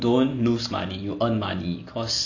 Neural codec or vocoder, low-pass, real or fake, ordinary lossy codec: none; 7.2 kHz; real; none